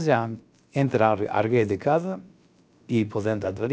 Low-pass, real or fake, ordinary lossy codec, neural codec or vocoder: none; fake; none; codec, 16 kHz, about 1 kbps, DyCAST, with the encoder's durations